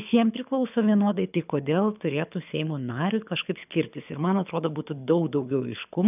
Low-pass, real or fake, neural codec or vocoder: 3.6 kHz; fake; codec, 24 kHz, 6 kbps, HILCodec